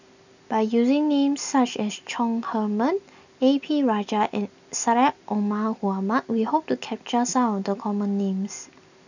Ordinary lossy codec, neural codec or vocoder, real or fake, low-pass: none; none; real; 7.2 kHz